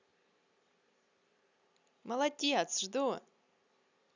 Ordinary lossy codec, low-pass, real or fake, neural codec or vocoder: none; 7.2 kHz; real; none